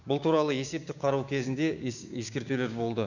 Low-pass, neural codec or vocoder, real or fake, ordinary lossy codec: 7.2 kHz; codec, 16 kHz, 6 kbps, DAC; fake; none